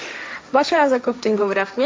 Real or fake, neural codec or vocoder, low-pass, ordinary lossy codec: fake; codec, 16 kHz, 1.1 kbps, Voila-Tokenizer; none; none